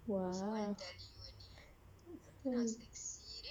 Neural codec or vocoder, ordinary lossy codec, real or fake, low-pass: vocoder, 48 kHz, 128 mel bands, Vocos; none; fake; 19.8 kHz